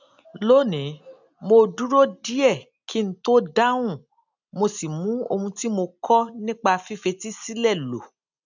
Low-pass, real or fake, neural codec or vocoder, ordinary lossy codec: 7.2 kHz; real; none; none